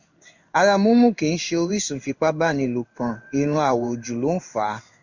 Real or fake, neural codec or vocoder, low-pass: fake; codec, 16 kHz in and 24 kHz out, 1 kbps, XY-Tokenizer; 7.2 kHz